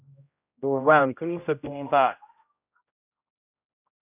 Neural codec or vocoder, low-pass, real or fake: codec, 16 kHz, 0.5 kbps, X-Codec, HuBERT features, trained on general audio; 3.6 kHz; fake